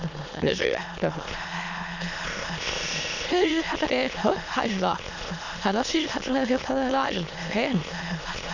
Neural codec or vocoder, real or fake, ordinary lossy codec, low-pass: autoencoder, 22.05 kHz, a latent of 192 numbers a frame, VITS, trained on many speakers; fake; none; 7.2 kHz